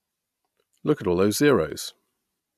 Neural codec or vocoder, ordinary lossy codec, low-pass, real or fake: none; AAC, 96 kbps; 14.4 kHz; real